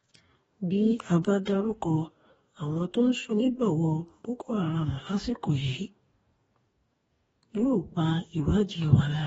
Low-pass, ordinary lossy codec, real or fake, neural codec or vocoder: 19.8 kHz; AAC, 24 kbps; fake; codec, 44.1 kHz, 2.6 kbps, DAC